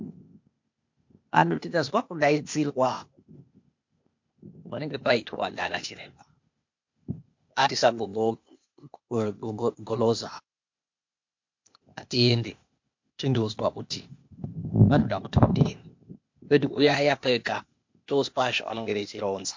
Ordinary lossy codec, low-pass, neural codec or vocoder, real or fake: MP3, 48 kbps; 7.2 kHz; codec, 16 kHz, 0.8 kbps, ZipCodec; fake